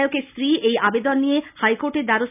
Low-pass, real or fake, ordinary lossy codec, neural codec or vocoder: 3.6 kHz; real; none; none